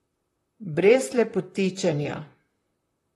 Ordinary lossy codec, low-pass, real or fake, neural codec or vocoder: AAC, 32 kbps; 19.8 kHz; fake; vocoder, 44.1 kHz, 128 mel bands, Pupu-Vocoder